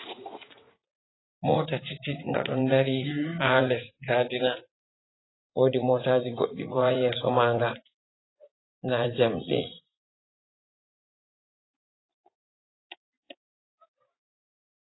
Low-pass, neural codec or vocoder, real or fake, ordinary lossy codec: 7.2 kHz; vocoder, 44.1 kHz, 80 mel bands, Vocos; fake; AAC, 16 kbps